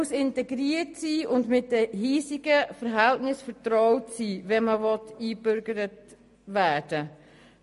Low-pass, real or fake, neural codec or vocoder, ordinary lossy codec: 14.4 kHz; real; none; MP3, 48 kbps